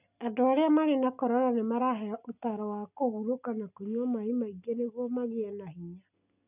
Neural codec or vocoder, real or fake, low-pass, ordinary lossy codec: none; real; 3.6 kHz; none